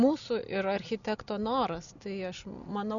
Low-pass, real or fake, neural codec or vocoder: 7.2 kHz; real; none